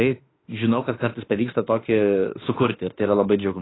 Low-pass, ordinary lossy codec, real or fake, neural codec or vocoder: 7.2 kHz; AAC, 16 kbps; fake; autoencoder, 48 kHz, 32 numbers a frame, DAC-VAE, trained on Japanese speech